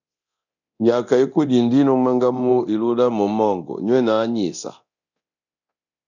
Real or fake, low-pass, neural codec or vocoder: fake; 7.2 kHz; codec, 24 kHz, 0.9 kbps, DualCodec